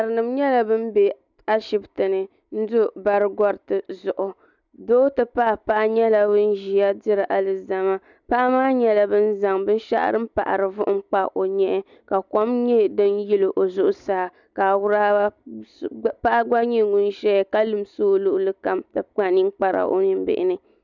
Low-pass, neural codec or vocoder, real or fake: 7.2 kHz; none; real